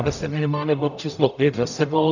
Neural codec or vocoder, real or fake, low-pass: codec, 44.1 kHz, 0.9 kbps, DAC; fake; 7.2 kHz